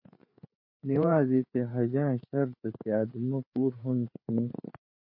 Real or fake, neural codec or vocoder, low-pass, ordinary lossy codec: fake; codec, 16 kHz, 4 kbps, FreqCodec, larger model; 5.4 kHz; MP3, 32 kbps